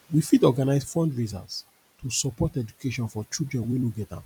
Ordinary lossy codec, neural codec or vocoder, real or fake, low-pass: none; vocoder, 44.1 kHz, 128 mel bands every 256 samples, BigVGAN v2; fake; 19.8 kHz